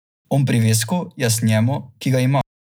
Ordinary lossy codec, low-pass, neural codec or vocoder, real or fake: none; none; none; real